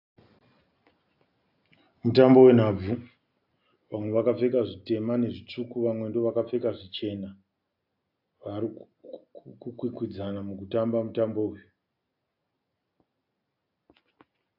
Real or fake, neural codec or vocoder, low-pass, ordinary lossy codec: real; none; 5.4 kHz; AAC, 48 kbps